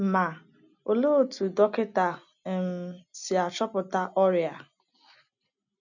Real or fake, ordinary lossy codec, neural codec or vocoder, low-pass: real; none; none; 7.2 kHz